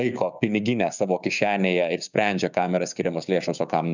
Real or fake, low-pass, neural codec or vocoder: fake; 7.2 kHz; codec, 16 kHz, 6 kbps, DAC